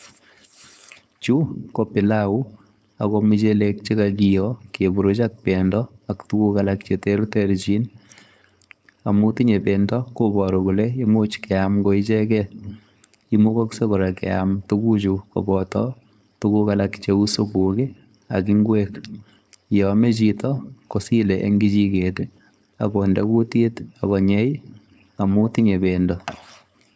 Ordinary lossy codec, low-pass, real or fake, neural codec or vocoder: none; none; fake; codec, 16 kHz, 4.8 kbps, FACodec